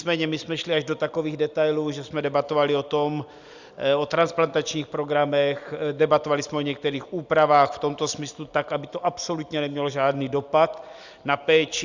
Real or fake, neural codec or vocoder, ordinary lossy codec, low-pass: real; none; Opus, 64 kbps; 7.2 kHz